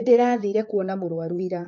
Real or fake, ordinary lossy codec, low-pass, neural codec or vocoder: fake; MP3, 48 kbps; 7.2 kHz; vocoder, 44.1 kHz, 128 mel bands, Pupu-Vocoder